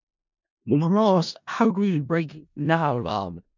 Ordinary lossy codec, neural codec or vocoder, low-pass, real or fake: MP3, 64 kbps; codec, 16 kHz in and 24 kHz out, 0.4 kbps, LongCat-Audio-Codec, four codebook decoder; 7.2 kHz; fake